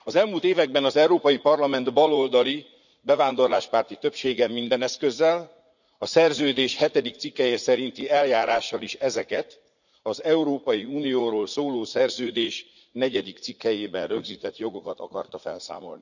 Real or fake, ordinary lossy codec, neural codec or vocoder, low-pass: fake; none; vocoder, 44.1 kHz, 80 mel bands, Vocos; 7.2 kHz